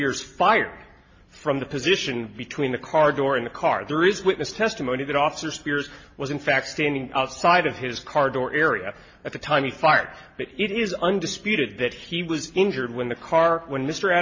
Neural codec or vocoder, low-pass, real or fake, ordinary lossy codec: none; 7.2 kHz; real; MP3, 32 kbps